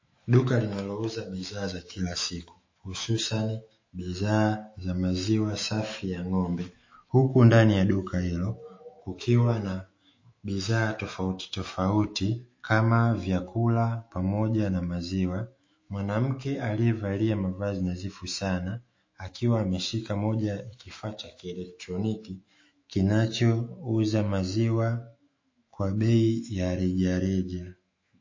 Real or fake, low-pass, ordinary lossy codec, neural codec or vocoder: fake; 7.2 kHz; MP3, 32 kbps; autoencoder, 48 kHz, 128 numbers a frame, DAC-VAE, trained on Japanese speech